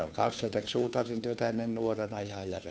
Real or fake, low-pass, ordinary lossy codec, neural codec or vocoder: fake; none; none; codec, 16 kHz, 2 kbps, FunCodec, trained on Chinese and English, 25 frames a second